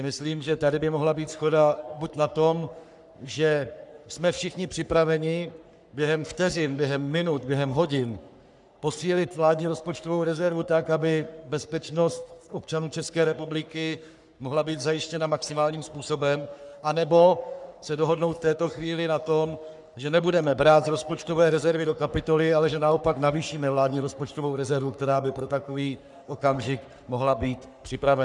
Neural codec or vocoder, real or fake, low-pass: codec, 44.1 kHz, 3.4 kbps, Pupu-Codec; fake; 10.8 kHz